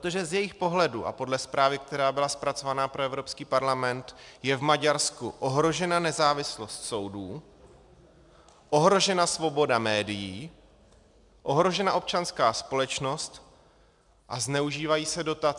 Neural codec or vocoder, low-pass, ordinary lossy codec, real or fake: none; 10.8 kHz; MP3, 96 kbps; real